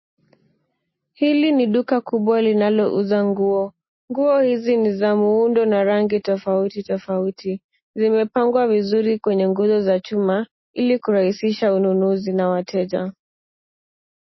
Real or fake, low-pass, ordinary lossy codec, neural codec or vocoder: real; 7.2 kHz; MP3, 24 kbps; none